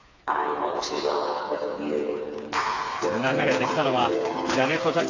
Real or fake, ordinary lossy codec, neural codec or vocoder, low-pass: fake; AAC, 32 kbps; codec, 16 kHz, 4 kbps, FreqCodec, smaller model; 7.2 kHz